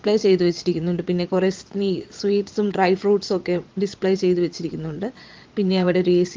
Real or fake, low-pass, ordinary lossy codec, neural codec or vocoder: fake; 7.2 kHz; Opus, 24 kbps; vocoder, 22.05 kHz, 80 mel bands, Vocos